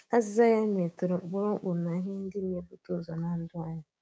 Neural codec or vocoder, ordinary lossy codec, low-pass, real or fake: codec, 16 kHz, 6 kbps, DAC; none; none; fake